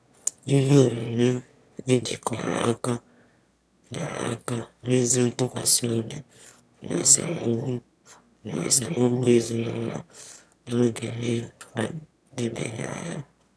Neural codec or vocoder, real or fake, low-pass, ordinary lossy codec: autoencoder, 22.05 kHz, a latent of 192 numbers a frame, VITS, trained on one speaker; fake; none; none